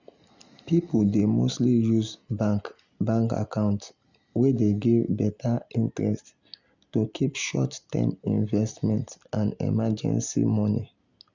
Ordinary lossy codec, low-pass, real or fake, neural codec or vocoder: Opus, 64 kbps; 7.2 kHz; real; none